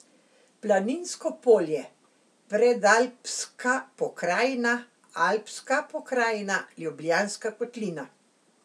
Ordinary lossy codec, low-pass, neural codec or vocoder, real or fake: none; none; none; real